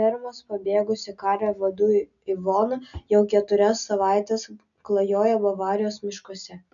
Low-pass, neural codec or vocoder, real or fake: 7.2 kHz; none; real